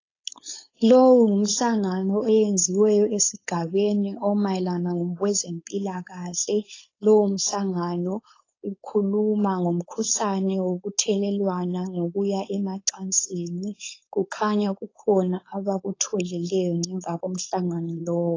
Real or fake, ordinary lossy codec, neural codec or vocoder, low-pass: fake; AAC, 32 kbps; codec, 16 kHz, 4.8 kbps, FACodec; 7.2 kHz